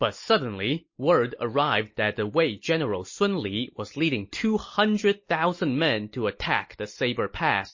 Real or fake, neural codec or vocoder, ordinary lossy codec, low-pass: real; none; MP3, 32 kbps; 7.2 kHz